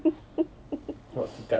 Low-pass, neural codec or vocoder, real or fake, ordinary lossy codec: none; none; real; none